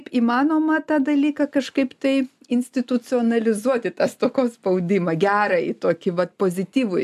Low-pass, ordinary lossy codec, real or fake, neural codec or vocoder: 14.4 kHz; AAC, 96 kbps; real; none